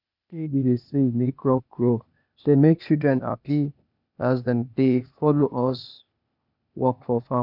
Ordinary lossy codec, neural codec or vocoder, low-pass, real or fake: none; codec, 16 kHz, 0.8 kbps, ZipCodec; 5.4 kHz; fake